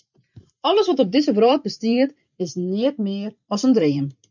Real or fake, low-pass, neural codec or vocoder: fake; 7.2 kHz; vocoder, 44.1 kHz, 128 mel bands every 512 samples, BigVGAN v2